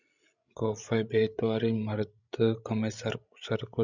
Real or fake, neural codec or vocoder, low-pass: fake; codec, 16 kHz, 16 kbps, FreqCodec, larger model; 7.2 kHz